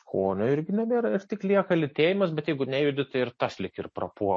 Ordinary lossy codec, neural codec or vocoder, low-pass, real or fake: MP3, 32 kbps; none; 9.9 kHz; real